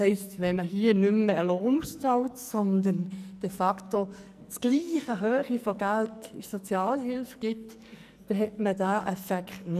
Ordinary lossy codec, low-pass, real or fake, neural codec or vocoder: none; 14.4 kHz; fake; codec, 44.1 kHz, 2.6 kbps, SNAC